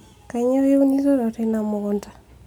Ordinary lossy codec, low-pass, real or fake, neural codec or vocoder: none; 19.8 kHz; real; none